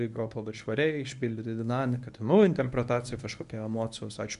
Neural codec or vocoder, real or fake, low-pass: codec, 24 kHz, 0.9 kbps, WavTokenizer, medium speech release version 1; fake; 10.8 kHz